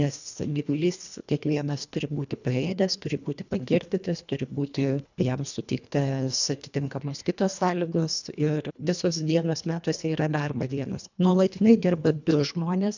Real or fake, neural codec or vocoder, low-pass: fake; codec, 24 kHz, 1.5 kbps, HILCodec; 7.2 kHz